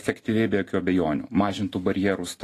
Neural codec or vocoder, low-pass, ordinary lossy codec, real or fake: none; 14.4 kHz; AAC, 48 kbps; real